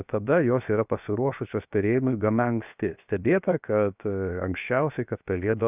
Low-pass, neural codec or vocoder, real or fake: 3.6 kHz; codec, 16 kHz, 0.7 kbps, FocalCodec; fake